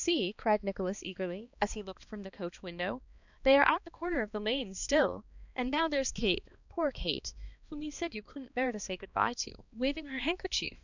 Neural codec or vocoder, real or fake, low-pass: codec, 16 kHz, 2 kbps, X-Codec, HuBERT features, trained on balanced general audio; fake; 7.2 kHz